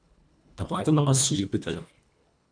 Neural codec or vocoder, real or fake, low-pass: codec, 24 kHz, 1.5 kbps, HILCodec; fake; 9.9 kHz